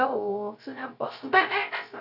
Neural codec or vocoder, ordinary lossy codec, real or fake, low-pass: codec, 16 kHz, 0.3 kbps, FocalCodec; none; fake; 5.4 kHz